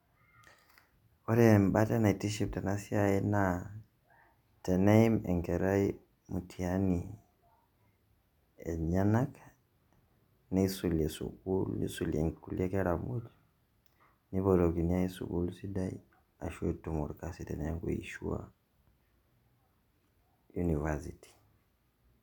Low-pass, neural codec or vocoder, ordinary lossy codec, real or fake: 19.8 kHz; none; none; real